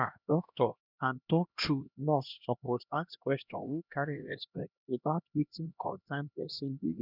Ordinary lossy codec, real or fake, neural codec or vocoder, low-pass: none; fake; codec, 16 kHz, 1 kbps, X-Codec, HuBERT features, trained on LibriSpeech; 5.4 kHz